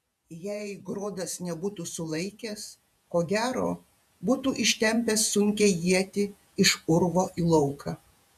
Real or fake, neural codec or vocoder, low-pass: fake; vocoder, 48 kHz, 128 mel bands, Vocos; 14.4 kHz